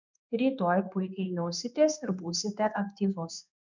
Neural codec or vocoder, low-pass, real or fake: codec, 16 kHz in and 24 kHz out, 1 kbps, XY-Tokenizer; 7.2 kHz; fake